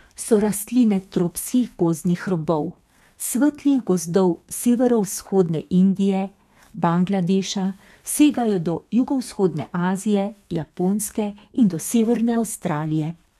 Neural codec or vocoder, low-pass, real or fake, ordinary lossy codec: codec, 32 kHz, 1.9 kbps, SNAC; 14.4 kHz; fake; none